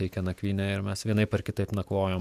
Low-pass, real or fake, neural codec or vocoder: 14.4 kHz; fake; vocoder, 48 kHz, 128 mel bands, Vocos